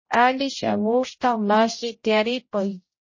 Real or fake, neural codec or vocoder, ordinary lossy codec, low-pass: fake; codec, 16 kHz, 0.5 kbps, X-Codec, HuBERT features, trained on general audio; MP3, 32 kbps; 7.2 kHz